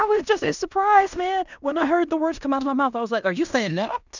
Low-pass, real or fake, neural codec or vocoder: 7.2 kHz; fake; codec, 16 kHz in and 24 kHz out, 0.9 kbps, LongCat-Audio-Codec, fine tuned four codebook decoder